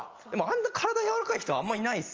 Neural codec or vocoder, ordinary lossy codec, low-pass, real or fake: none; Opus, 24 kbps; 7.2 kHz; real